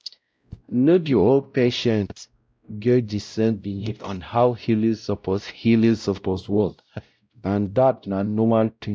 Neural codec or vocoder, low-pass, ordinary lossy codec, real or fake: codec, 16 kHz, 0.5 kbps, X-Codec, WavLM features, trained on Multilingual LibriSpeech; none; none; fake